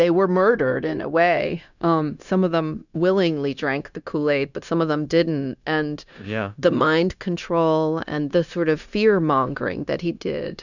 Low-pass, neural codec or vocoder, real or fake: 7.2 kHz; codec, 16 kHz, 0.9 kbps, LongCat-Audio-Codec; fake